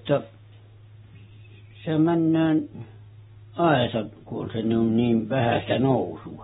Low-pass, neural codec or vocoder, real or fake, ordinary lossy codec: 19.8 kHz; none; real; AAC, 16 kbps